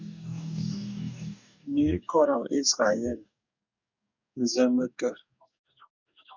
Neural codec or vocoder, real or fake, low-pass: codec, 44.1 kHz, 2.6 kbps, DAC; fake; 7.2 kHz